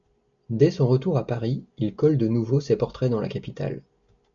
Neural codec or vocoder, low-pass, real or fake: none; 7.2 kHz; real